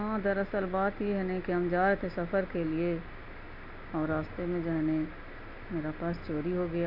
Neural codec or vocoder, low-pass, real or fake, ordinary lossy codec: none; 5.4 kHz; real; none